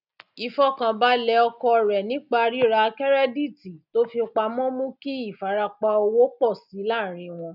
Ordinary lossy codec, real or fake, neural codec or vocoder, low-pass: none; real; none; 5.4 kHz